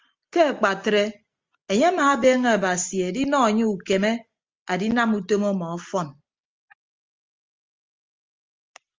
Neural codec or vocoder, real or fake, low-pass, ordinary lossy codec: none; real; 7.2 kHz; Opus, 32 kbps